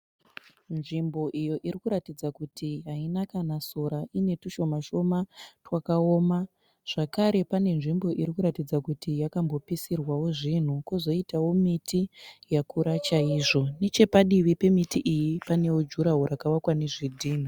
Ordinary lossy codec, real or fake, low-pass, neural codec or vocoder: Opus, 64 kbps; real; 19.8 kHz; none